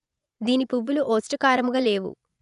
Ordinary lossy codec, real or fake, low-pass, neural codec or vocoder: none; fake; 10.8 kHz; vocoder, 24 kHz, 100 mel bands, Vocos